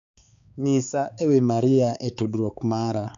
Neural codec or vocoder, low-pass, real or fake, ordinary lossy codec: codec, 16 kHz, 4 kbps, X-Codec, HuBERT features, trained on balanced general audio; 7.2 kHz; fake; none